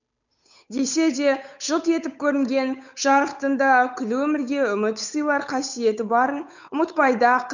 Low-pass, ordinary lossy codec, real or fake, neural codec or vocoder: 7.2 kHz; none; fake; codec, 16 kHz, 8 kbps, FunCodec, trained on Chinese and English, 25 frames a second